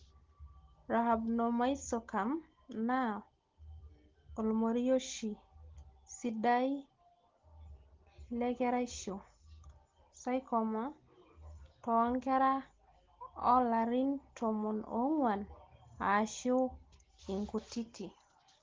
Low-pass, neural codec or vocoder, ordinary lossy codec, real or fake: 7.2 kHz; none; Opus, 16 kbps; real